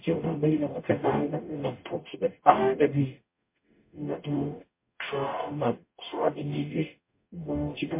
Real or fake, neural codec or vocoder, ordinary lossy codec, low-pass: fake; codec, 44.1 kHz, 0.9 kbps, DAC; MP3, 24 kbps; 3.6 kHz